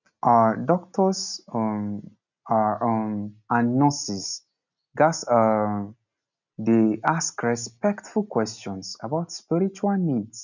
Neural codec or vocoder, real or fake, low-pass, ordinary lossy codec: none; real; 7.2 kHz; none